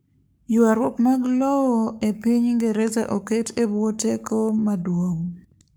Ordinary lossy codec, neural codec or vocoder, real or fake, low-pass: none; codec, 44.1 kHz, 7.8 kbps, Pupu-Codec; fake; none